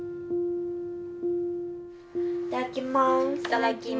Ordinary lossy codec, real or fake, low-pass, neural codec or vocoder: none; real; none; none